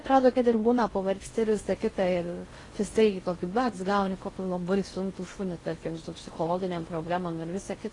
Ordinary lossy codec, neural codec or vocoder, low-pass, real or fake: AAC, 32 kbps; codec, 16 kHz in and 24 kHz out, 0.6 kbps, FocalCodec, streaming, 4096 codes; 10.8 kHz; fake